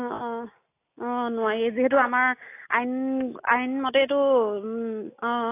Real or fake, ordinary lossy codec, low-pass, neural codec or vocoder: real; AAC, 24 kbps; 3.6 kHz; none